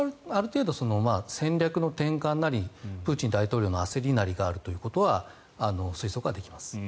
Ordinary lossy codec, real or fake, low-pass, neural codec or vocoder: none; real; none; none